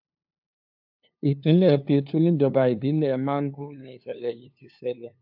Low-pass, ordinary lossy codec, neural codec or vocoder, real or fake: 5.4 kHz; AAC, 48 kbps; codec, 16 kHz, 2 kbps, FunCodec, trained on LibriTTS, 25 frames a second; fake